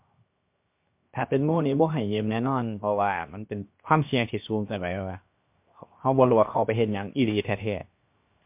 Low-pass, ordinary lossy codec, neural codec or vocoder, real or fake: 3.6 kHz; MP3, 32 kbps; codec, 16 kHz, 0.7 kbps, FocalCodec; fake